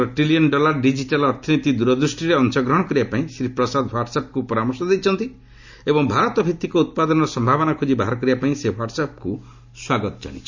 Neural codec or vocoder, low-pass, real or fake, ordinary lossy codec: none; 7.2 kHz; real; Opus, 64 kbps